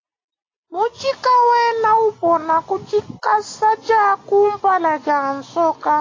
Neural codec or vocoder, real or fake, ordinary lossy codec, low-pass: none; real; AAC, 32 kbps; 7.2 kHz